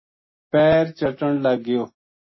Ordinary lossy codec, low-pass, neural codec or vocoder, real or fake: MP3, 24 kbps; 7.2 kHz; none; real